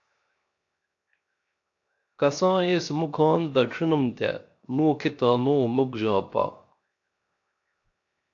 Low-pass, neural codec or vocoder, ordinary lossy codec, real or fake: 7.2 kHz; codec, 16 kHz, 0.7 kbps, FocalCodec; AAC, 48 kbps; fake